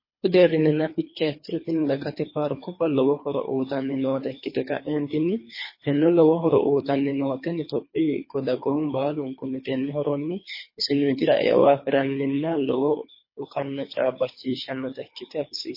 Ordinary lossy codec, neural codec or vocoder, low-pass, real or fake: MP3, 24 kbps; codec, 24 kHz, 3 kbps, HILCodec; 5.4 kHz; fake